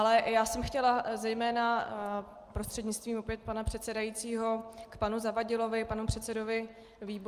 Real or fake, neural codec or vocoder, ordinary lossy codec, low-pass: real; none; Opus, 32 kbps; 14.4 kHz